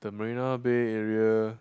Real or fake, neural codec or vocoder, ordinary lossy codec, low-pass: real; none; none; none